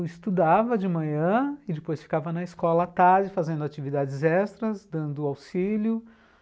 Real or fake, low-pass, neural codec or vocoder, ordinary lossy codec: real; none; none; none